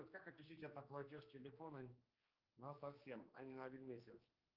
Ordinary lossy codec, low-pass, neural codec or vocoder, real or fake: Opus, 24 kbps; 5.4 kHz; codec, 16 kHz, 2 kbps, X-Codec, HuBERT features, trained on general audio; fake